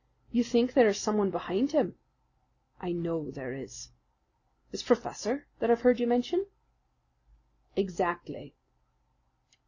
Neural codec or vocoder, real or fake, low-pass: none; real; 7.2 kHz